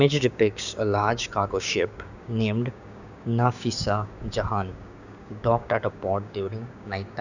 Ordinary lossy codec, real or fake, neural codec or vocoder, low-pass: none; fake; codec, 16 kHz, 6 kbps, DAC; 7.2 kHz